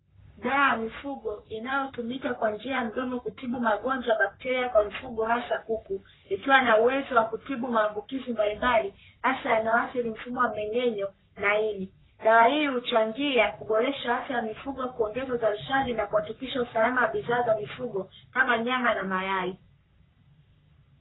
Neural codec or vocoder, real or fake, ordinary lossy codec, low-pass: codec, 44.1 kHz, 3.4 kbps, Pupu-Codec; fake; AAC, 16 kbps; 7.2 kHz